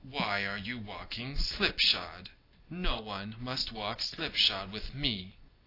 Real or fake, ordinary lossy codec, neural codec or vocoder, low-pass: real; AAC, 32 kbps; none; 5.4 kHz